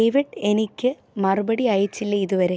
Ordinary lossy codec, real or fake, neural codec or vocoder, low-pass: none; real; none; none